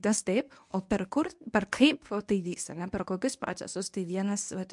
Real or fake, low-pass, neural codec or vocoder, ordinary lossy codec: fake; 10.8 kHz; codec, 24 kHz, 0.9 kbps, WavTokenizer, medium speech release version 1; MP3, 64 kbps